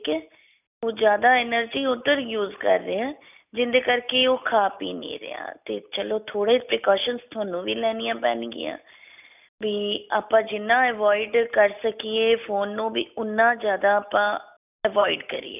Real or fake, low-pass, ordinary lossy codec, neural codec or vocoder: real; 3.6 kHz; none; none